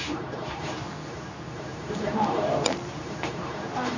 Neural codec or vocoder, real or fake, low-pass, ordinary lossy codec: codec, 24 kHz, 0.9 kbps, WavTokenizer, medium speech release version 2; fake; 7.2 kHz; none